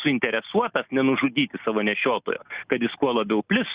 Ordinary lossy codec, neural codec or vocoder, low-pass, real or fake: Opus, 64 kbps; none; 3.6 kHz; real